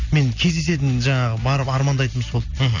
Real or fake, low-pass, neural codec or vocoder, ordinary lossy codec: real; 7.2 kHz; none; none